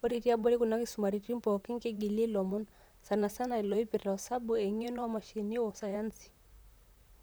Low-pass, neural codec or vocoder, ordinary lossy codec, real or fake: none; vocoder, 44.1 kHz, 128 mel bands, Pupu-Vocoder; none; fake